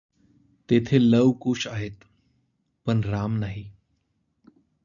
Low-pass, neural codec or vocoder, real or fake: 7.2 kHz; none; real